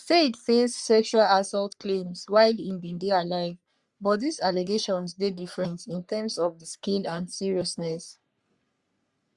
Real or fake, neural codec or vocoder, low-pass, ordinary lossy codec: fake; codec, 44.1 kHz, 3.4 kbps, Pupu-Codec; 10.8 kHz; Opus, 64 kbps